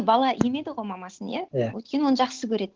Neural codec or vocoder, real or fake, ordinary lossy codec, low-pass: none; real; Opus, 16 kbps; 7.2 kHz